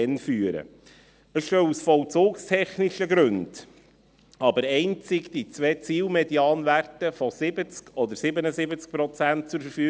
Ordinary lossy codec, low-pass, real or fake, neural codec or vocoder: none; none; real; none